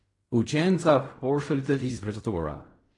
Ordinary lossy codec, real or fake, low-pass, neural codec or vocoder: AAC, 32 kbps; fake; 10.8 kHz; codec, 16 kHz in and 24 kHz out, 0.4 kbps, LongCat-Audio-Codec, fine tuned four codebook decoder